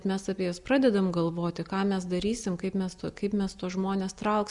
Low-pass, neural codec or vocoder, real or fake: 10.8 kHz; none; real